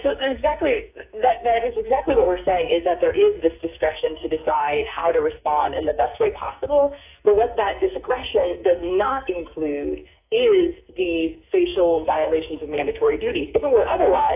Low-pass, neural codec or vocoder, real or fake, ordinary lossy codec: 3.6 kHz; codec, 44.1 kHz, 2.6 kbps, SNAC; fake; AAC, 32 kbps